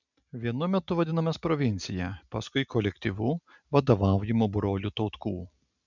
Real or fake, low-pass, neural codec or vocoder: real; 7.2 kHz; none